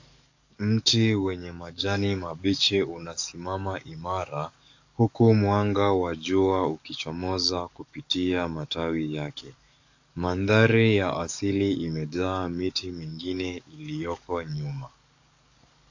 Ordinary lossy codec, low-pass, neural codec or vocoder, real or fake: AAC, 48 kbps; 7.2 kHz; codec, 44.1 kHz, 7.8 kbps, DAC; fake